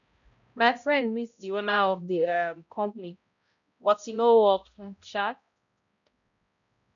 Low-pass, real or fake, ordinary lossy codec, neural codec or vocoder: 7.2 kHz; fake; none; codec, 16 kHz, 0.5 kbps, X-Codec, HuBERT features, trained on balanced general audio